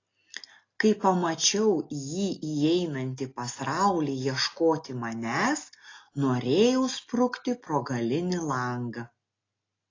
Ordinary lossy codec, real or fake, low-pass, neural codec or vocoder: AAC, 32 kbps; real; 7.2 kHz; none